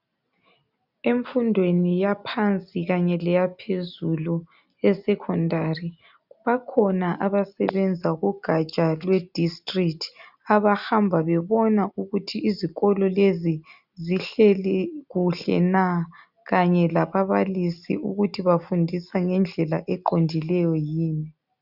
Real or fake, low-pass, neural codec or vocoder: real; 5.4 kHz; none